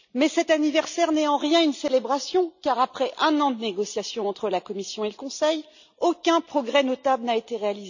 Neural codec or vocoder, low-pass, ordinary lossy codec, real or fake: none; 7.2 kHz; none; real